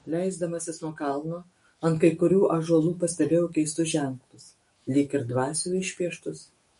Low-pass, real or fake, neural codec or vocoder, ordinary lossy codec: 19.8 kHz; fake; autoencoder, 48 kHz, 128 numbers a frame, DAC-VAE, trained on Japanese speech; MP3, 48 kbps